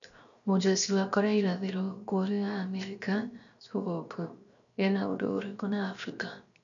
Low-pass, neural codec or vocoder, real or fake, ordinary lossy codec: 7.2 kHz; codec, 16 kHz, 0.7 kbps, FocalCodec; fake; none